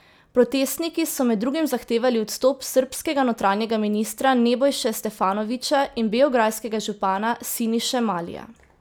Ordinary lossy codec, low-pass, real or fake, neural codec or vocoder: none; none; real; none